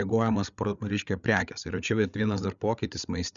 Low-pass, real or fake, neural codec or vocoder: 7.2 kHz; fake; codec, 16 kHz, 8 kbps, FreqCodec, larger model